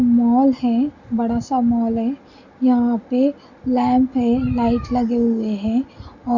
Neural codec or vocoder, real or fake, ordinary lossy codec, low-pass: none; real; Opus, 64 kbps; 7.2 kHz